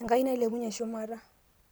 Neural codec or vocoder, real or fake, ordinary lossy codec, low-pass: none; real; none; none